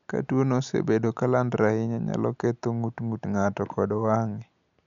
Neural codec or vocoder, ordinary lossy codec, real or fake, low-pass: none; none; real; 7.2 kHz